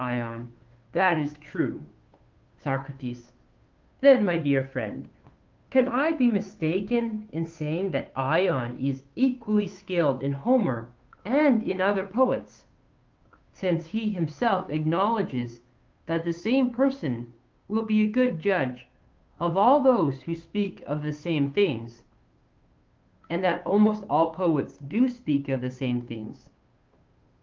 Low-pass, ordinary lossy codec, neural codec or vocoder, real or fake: 7.2 kHz; Opus, 32 kbps; codec, 16 kHz, 2 kbps, FunCodec, trained on Chinese and English, 25 frames a second; fake